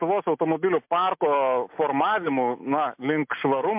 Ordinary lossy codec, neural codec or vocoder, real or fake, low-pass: MP3, 32 kbps; none; real; 3.6 kHz